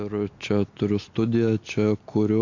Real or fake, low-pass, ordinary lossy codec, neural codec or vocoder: real; 7.2 kHz; AAC, 48 kbps; none